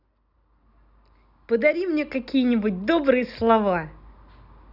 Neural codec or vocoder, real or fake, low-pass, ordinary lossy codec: none; real; 5.4 kHz; none